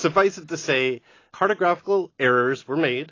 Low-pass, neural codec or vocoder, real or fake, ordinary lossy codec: 7.2 kHz; none; real; AAC, 32 kbps